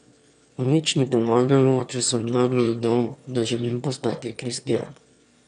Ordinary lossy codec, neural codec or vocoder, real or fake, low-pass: none; autoencoder, 22.05 kHz, a latent of 192 numbers a frame, VITS, trained on one speaker; fake; 9.9 kHz